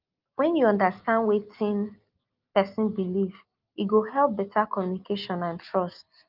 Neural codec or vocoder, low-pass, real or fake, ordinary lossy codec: none; 5.4 kHz; real; Opus, 24 kbps